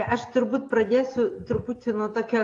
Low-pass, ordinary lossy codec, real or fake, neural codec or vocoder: 10.8 kHz; AAC, 48 kbps; real; none